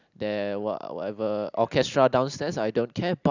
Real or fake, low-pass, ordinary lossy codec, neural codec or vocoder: real; 7.2 kHz; none; none